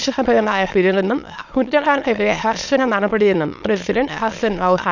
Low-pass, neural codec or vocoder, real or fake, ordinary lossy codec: 7.2 kHz; autoencoder, 22.05 kHz, a latent of 192 numbers a frame, VITS, trained on many speakers; fake; none